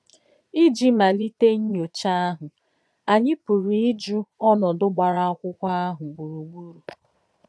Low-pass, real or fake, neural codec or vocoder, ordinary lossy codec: none; fake; vocoder, 22.05 kHz, 80 mel bands, Vocos; none